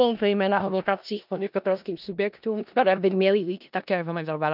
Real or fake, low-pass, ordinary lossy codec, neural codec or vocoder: fake; 5.4 kHz; none; codec, 16 kHz in and 24 kHz out, 0.4 kbps, LongCat-Audio-Codec, four codebook decoder